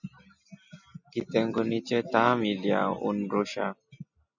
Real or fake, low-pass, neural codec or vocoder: real; 7.2 kHz; none